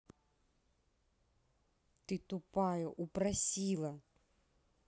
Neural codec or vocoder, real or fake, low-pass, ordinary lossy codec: none; real; none; none